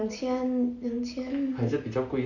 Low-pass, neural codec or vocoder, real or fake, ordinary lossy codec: 7.2 kHz; none; real; none